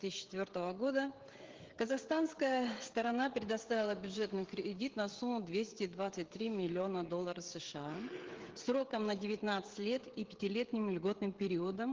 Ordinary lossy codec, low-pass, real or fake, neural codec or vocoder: Opus, 16 kbps; 7.2 kHz; fake; codec, 16 kHz, 16 kbps, FreqCodec, smaller model